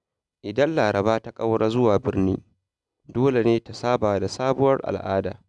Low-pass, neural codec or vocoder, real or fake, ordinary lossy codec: 10.8 kHz; none; real; none